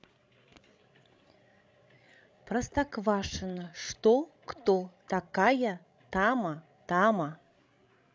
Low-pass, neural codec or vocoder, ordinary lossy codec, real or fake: none; codec, 16 kHz, 16 kbps, FreqCodec, larger model; none; fake